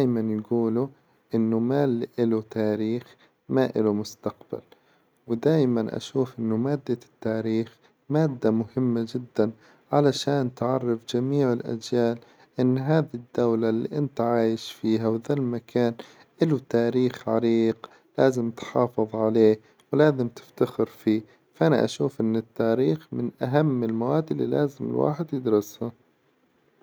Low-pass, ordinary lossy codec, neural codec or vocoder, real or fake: none; none; none; real